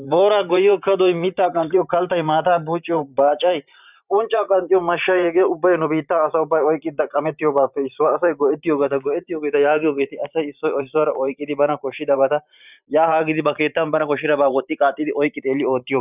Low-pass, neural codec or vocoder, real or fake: 3.6 kHz; vocoder, 44.1 kHz, 128 mel bands every 512 samples, BigVGAN v2; fake